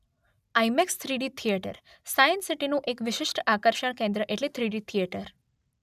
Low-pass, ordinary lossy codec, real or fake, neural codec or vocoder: 14.4 kHz; none; real; none